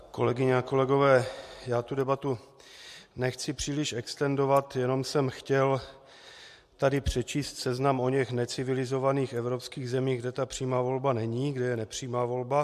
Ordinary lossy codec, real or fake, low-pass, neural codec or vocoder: MP3, 64 kbps; real; 14.4 kHz; none